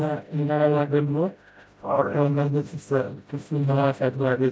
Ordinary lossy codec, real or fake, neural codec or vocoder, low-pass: none; fake; codec, 16 kHz, 0.5 kbps, FreqCodec, smaller model; none